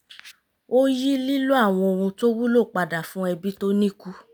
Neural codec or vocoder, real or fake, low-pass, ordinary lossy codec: none; real; none; none